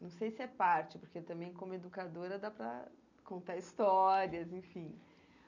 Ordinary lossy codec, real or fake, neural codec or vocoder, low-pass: none; real; none; 7.2 kHz